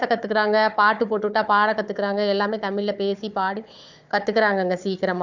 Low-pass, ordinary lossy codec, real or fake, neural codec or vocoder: 7.2 kHz; none; fake; codec, 16 kHz, 4 kbps, FunCodec, trained on Chinese and English, 50 frames a second